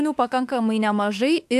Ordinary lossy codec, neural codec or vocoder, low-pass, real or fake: AAC, 96 kbps; autoencoder, 48 kHz, 32 numbers a frame, DAC-VAE, trained on Japanese speech; 14.4 kHz; fake